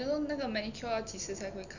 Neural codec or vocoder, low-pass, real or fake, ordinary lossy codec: none; 7.2 kHz; real; none